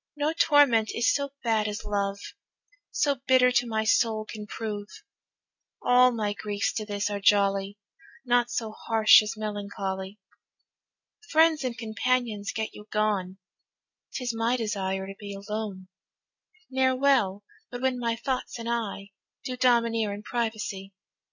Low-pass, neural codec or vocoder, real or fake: 7.2 kHz; none; real